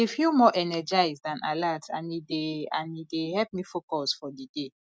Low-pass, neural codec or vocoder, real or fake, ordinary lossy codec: none; none; real; none